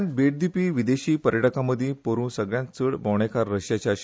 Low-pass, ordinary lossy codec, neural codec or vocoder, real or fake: none; none; none; real